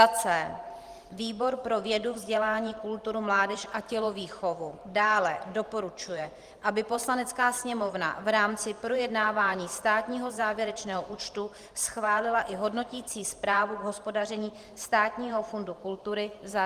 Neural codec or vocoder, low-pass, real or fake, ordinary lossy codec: vocoder, 44.1 kHz, 128 mel bands every 512 samples, BigVGAN v2; 14.4 kHz; fake; Opus, 24 kbps